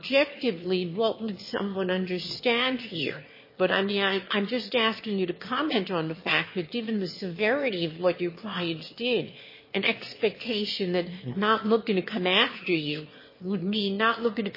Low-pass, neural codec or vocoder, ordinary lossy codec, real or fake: 5.4 kHz; autoencoder, 22.05 kHz, a latent of 192 numbers a frame, VITS, trained on one speaker; MP3, 24 kbps; fake